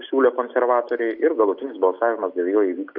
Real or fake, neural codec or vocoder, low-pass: real; none; 5.4 kHz